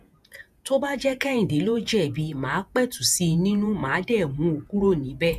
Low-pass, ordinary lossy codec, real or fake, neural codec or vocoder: 14.4 kHz; none; fake; vocoder, 48 kHz, 128 mel bands, Vocos